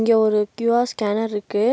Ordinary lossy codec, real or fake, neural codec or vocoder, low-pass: none; real; none; none